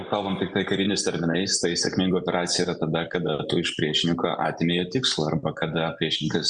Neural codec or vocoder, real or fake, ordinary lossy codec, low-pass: none; real; Opus, 64 kbps; 9.9 kHz